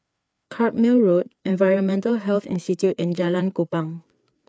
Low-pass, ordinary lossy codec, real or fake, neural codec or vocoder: none; none; fake; codec, 16 kHz, 4 kbps, FreqCodec, larger model